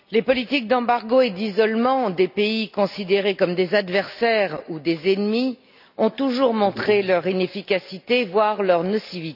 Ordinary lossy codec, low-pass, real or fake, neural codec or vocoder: none; 5.4 kHz; real; none